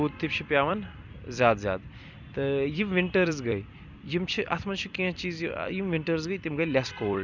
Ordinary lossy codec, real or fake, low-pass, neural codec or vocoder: none; fake; 7.2 kHz; vocoder, 44.1 kHz, 128 mel bands every 256 samples, BigVGAN v2